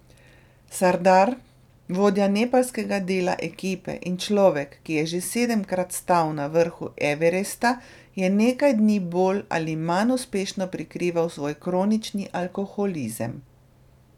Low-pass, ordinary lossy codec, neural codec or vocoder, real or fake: 19.8 kHz; none; none; real